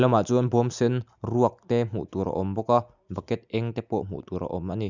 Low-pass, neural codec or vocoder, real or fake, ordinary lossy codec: 7.2 kHz; none; real; none